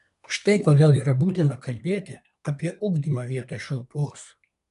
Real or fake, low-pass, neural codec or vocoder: fake; 10.8 kHz; codec, 24 kHz, 1 kbps, SNAC